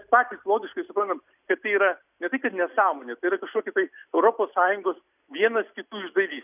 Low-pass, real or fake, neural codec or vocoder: 3.6 kHz; real; none